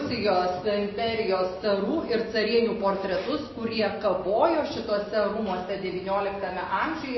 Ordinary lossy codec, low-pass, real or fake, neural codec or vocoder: MP3, 24 kbps; 7.2 kHz; real; none